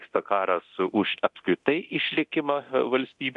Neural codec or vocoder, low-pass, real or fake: codec, 24 kHz, 0.9 kbps, DualCodec; 10.8 kHz; fake